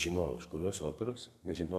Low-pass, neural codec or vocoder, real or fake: 14.4 kHz; codec, 32 kHz, 1.9 kbps, SNAC; fake